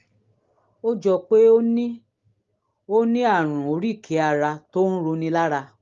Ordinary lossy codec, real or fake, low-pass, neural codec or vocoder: Opus, 32 kbps; real; 7.2 kHz; none